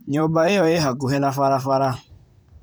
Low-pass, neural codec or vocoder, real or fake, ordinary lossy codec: none; vocoder, 44.1 kHz, 128 mel bands, Pupu-Vocoder; fake; none